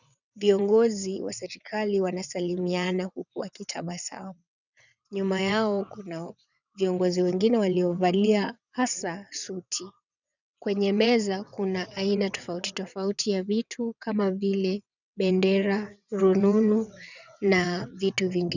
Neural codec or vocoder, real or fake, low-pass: vocoder, 22.05 kHz, 80 mel bands, WaveNeXt; fake; 7.2 kHz